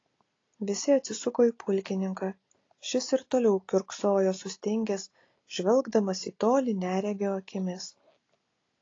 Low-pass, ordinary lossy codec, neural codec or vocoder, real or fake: 7.2 kHz; AAC, 32 kbps; none; real